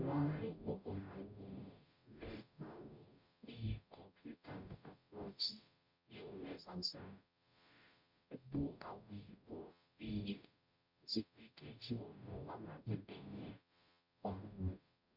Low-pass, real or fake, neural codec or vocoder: 5.4 kHz; fake; codec, 44.1 kHz, 0.9 kbps, DAC